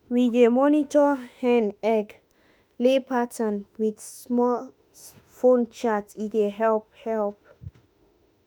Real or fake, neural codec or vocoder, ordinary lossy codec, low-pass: fake; autoencoder, 48 kHz, 32 numbers a frame, DAC-VAE, trained on Japanese speech; none; none